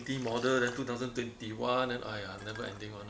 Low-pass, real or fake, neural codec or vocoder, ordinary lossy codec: none; real; none; none